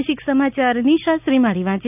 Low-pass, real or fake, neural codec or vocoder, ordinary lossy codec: 3.6 kHz; real; none; none